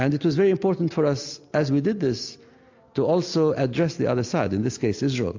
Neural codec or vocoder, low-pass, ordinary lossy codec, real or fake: none; 7.2 kHz; AAC, 48 kbps; real